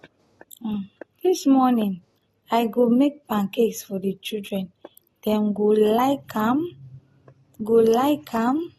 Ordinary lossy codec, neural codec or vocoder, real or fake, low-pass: AAC, 32 kbps; none; real; 19.8 kHz